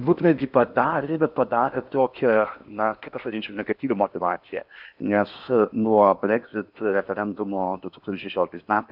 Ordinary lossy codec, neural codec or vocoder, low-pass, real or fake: AAC, 48 kbps; codec, 16 kHz in and 24 kHz out, 0.8 kbps, FocalCodec, streaming, 65536 codes; 5.4 kHz; fake